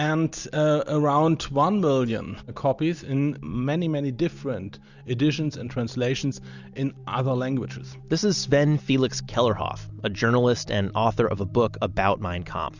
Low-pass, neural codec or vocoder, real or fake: 7.2 kHz; none; real